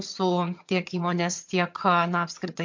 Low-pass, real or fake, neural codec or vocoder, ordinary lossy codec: 7.2 kHz; fake; vocoder, 22.05 kHz, 80 mel bands, HiFi-GAN; MP3, 48 kbps